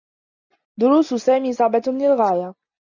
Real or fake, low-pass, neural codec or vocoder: real; 7.2 kHz; none